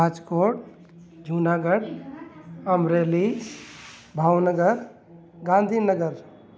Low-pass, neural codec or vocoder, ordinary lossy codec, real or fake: none; none; none; real